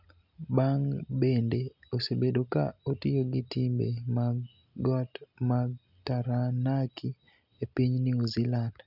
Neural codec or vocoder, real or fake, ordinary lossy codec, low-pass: none; real; none; 5.4 kHz